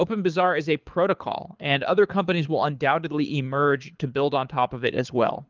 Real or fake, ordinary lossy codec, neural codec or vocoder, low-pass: fake; Opus, 32 kbps; codec, 24 kHz, 6 kbps, HILCodec; 7.2 kHz